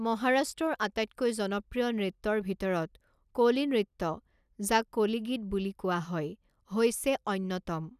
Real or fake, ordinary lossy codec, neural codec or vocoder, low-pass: real; none; none; 14.4 kHz